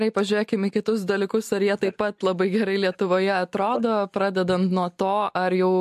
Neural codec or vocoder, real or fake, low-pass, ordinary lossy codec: none; real; 14.4 kHz; MP3, 64 kbps